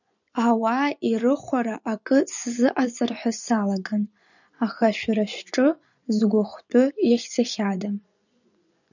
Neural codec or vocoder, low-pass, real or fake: vocoder, 24 kHz, 100 mel bands, Vocos; 7.2 kHz; fake